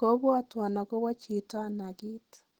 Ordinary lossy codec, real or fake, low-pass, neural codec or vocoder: Opus, 16 kbps; real; 19.8 kHz; none